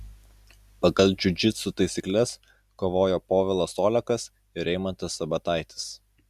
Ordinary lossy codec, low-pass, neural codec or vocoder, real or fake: Opus, 64 kbps; 14.4 kHz; none; real